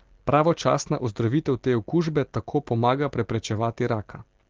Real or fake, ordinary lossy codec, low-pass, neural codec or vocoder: real; Opus, 16 kbps; 7.2 kHz; none